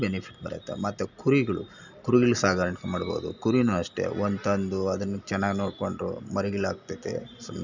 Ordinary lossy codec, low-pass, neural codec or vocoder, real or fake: none; 7.2 kHz; none; real